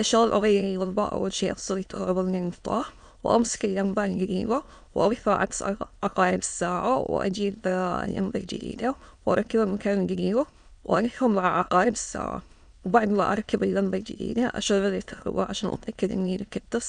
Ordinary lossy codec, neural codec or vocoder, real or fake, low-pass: none; autoencoder, 22.05 kHz, a latent of 192 numbers a frame, VITS, trained on many speakers; fake; 9.9 kHz